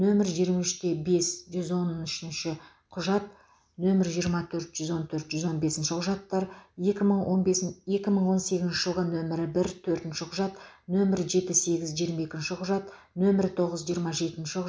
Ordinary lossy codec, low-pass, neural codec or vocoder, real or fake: none; none; none; real